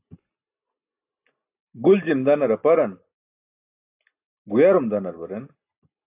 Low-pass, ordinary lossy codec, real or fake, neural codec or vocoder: 3.6 kHz; AAC, 32 kbps; real; none